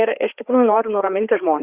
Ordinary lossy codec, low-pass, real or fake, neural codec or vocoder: Opus, 64 kbps; 3.6 kHz; fake; codec, 16 kHz, 4 kbps, FreqCodec, larger model